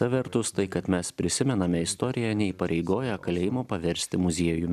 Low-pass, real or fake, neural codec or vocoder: 14.4 kHz; real; none